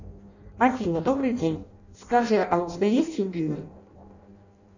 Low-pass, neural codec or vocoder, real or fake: 7.2 kHz; codec, 16 kHz in and 24 kHz out, 0.6 kbps, FireRedTTS-2 codec; fake